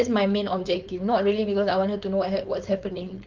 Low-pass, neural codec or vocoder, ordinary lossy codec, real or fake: 7.2 kHz; codec, 16 kHz, 4.8 kbps, FACodec; Opus, 24 kbps; fake